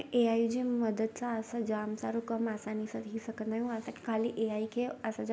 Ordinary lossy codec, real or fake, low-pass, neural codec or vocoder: none; real; none; none